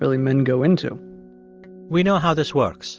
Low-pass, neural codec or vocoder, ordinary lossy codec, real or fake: 7.2 kHz; none; Opus, 24 kbps; real